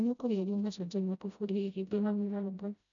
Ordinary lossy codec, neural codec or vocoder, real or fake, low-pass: none; codec, 16 kHz, 0.5 kbps, FreqCodec, smaller model; fake; 7.2 kHz